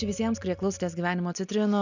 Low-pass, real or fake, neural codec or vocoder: 7.2 kHz; real; none